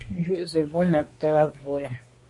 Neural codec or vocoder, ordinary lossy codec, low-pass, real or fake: codec, 24 kHz, 1 kbps, SNAC; MP3, 48 kbps; 10.8 kHz; fake